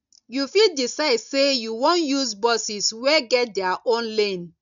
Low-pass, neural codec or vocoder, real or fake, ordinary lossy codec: 7.2 kHz; none; real; none